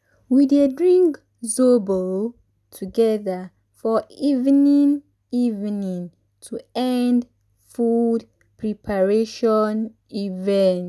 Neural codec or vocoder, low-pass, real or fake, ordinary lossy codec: none; none; real; none